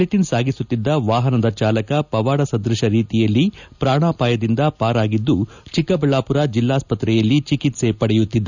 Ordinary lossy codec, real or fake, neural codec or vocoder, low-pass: none; real; none; 7.2 kHz